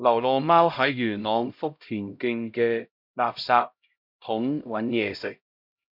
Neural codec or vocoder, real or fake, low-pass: codec, 16 kHz, 0.5 kbps, X-Codec, HuBERT features, trained on LibriSpeech; fake; 5.4 kHz